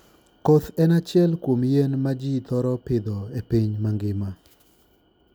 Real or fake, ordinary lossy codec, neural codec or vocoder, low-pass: real; none; none; none